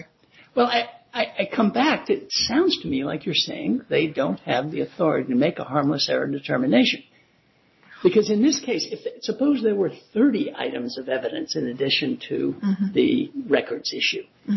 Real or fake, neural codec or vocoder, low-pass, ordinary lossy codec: real; none; 7.2 kHz; MP3, 24 kbps